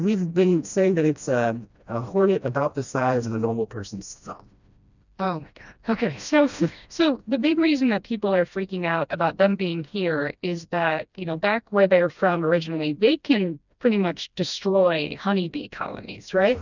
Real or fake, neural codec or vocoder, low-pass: fake; codec, 16 kHz, 1 kbps, FreqCodec, smaller model; 7.2 kHz